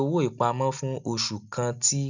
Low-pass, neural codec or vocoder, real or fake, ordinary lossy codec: 7.2 kHz; none; real; none